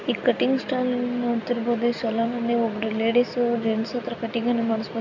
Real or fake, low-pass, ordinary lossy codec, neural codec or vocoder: real; 7.2 kHz; none; none